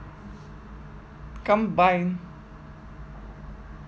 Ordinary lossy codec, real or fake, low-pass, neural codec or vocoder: none; real; none; none